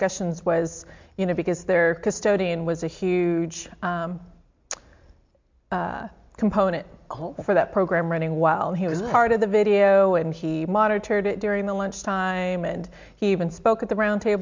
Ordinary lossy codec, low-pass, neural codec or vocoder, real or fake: MP3, 64 kbps; 7.2 kHz; none; real